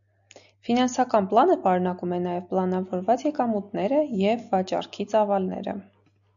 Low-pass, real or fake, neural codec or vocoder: 7.2 kHz; real; none